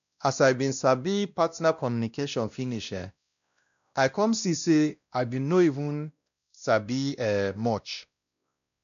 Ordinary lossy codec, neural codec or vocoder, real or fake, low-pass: none; codec, 16 kHz, 1 kbps, X-Codec, WavLM features, trained on Multilingual LibriSpeech; fake; 7.2 kHz